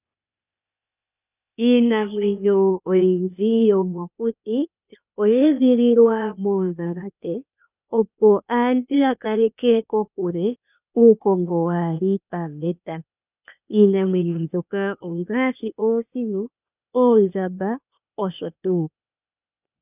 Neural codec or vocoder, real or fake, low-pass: codec, 16 kHz, 0.8 kbps, ZipCodec; fake; 3.6 kHz